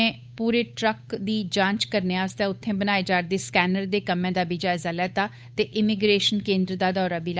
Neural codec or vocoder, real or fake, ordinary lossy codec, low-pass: codec, 16 kHz, 8 kbps, FunCodec, trained on Chinese and English, 25 frames a second; fake; none; none